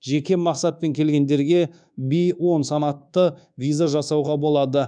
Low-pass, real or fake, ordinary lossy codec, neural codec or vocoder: 9.9 kHz; fake; none; codec, 24 kHz, 1.2 kbps, DualCodec